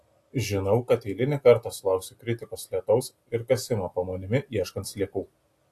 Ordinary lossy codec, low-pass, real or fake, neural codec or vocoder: AAC, 64 kbps; 14.4 kHz; real; none